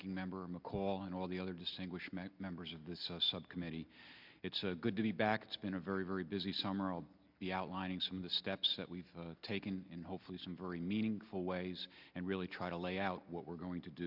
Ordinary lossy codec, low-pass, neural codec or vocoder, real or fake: MP3, 48 kbps; 5.4 kHz; none; real